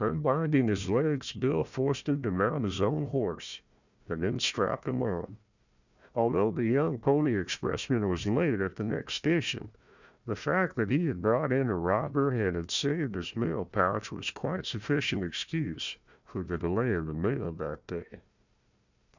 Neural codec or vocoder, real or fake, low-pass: codec, 16 kHz, 1 kbps, FunCodec, trained on Chinese and English, 50 frames a second; fake; 7.2 kHz